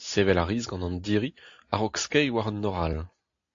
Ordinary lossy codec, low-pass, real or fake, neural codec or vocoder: AAC, 48 kbps; 7.2 kHz; real; none